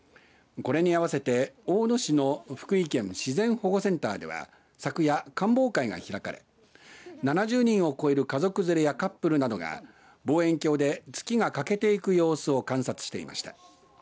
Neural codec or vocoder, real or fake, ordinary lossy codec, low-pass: none; real; none; none